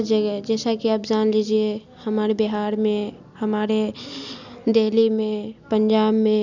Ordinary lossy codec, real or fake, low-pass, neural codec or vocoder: none; real; 7.2 kHz; none